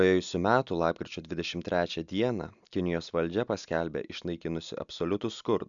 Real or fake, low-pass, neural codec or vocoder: real; 7.2 kHz; none